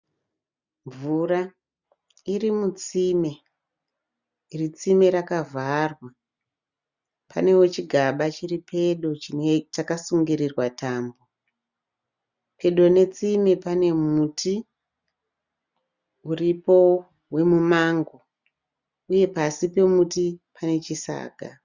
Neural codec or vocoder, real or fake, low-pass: none; real; 7.2 kHz